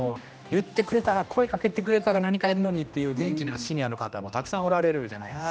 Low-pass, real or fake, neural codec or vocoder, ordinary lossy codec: none; fake; codec, 16 kHz, 1 kbps, X-Codec, HuBERT features, trained on general audio; none